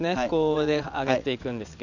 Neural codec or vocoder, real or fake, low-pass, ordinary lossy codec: vocoder, 22.05 kHz, 80 mel bands, WaveNeXt; fake; 7.2 kHz; none